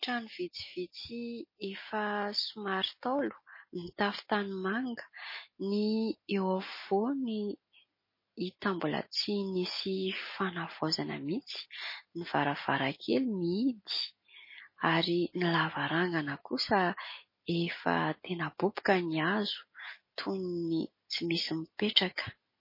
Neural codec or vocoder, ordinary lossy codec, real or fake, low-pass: none; MP3, 24 kbps; real; 5.4 kHz